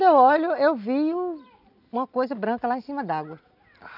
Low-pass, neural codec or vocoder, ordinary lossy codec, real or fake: 5.4 kHz; none; none; real